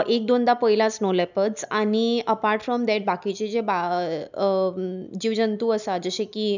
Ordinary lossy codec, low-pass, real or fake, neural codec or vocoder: none; 7.2 kHz; real; none